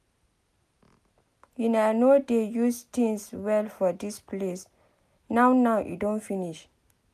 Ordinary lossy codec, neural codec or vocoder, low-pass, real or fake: none; none; 14.4 kHz; real